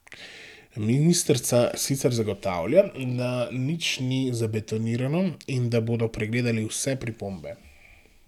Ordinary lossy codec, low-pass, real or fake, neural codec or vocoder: none; 19.8 kHz; real; none